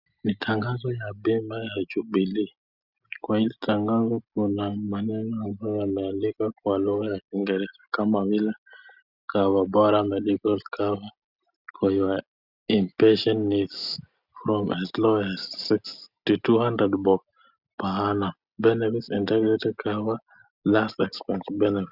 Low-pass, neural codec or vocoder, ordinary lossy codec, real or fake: 5.4 kHz; none; Opus, 64 kbps; real